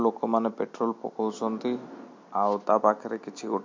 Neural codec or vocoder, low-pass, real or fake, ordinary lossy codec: none; 7.2 kHz; real; MP3, 48 kbps